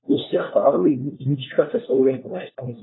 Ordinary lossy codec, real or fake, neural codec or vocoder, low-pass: AAC, 16 kbps; fake; codec, 16 kHz, 1 kbps, FunCodec, trained on LibriTTS, 50 frames a second; 7.2 kHz